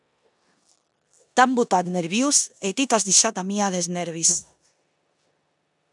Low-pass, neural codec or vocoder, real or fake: 10.8 kHz; codec, 16 kHz in and 24 kHz out, 0.9 kbps, LongCat-Audio-Codec, four codebook decoder; fake